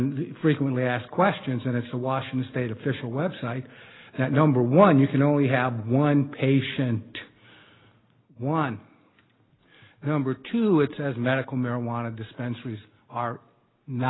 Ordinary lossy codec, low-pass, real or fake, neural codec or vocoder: AAC, 16 kbps; 7.2 kHz; real; none